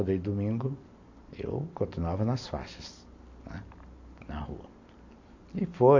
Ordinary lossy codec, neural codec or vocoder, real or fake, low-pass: AAC, 48 kbps; none; real; 7.2 kHz